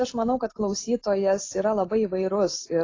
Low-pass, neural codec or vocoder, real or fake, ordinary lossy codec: 7.2 kHz; none; real; AAC, 32 kbps